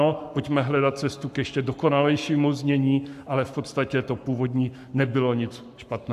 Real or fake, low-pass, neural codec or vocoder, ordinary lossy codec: fake; 14.4 kHz; codec, 44.1 kHz, 7.8 kbps, Pupu-Codec; AAC, 96 kbps